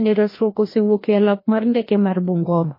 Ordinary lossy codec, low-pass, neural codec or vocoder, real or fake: MP3, 24 kbps; 5.4 kHz; codec, 16 kHz, 1 kbps, FreqCodec, larger model; fake